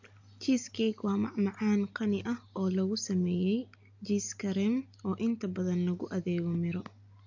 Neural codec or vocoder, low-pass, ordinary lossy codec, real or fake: none; 7.2 kHz; AAC, 48 kbps; real